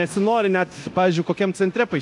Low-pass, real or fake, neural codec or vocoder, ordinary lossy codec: 10.8 kHz; fake; codec, 24 kHz, 0.9 kbps, DualCodec; MP3, 96 kbps